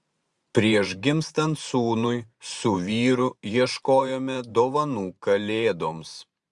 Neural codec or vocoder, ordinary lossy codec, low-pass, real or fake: vocoder, 44.1 kHz, 128 mel bands every 512 samples, BigVGAN v2; Opus, 64 kbps; 10.8 kHz; fake